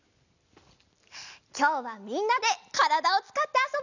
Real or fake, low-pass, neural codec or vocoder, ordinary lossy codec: real; 7.2 kHz; none; none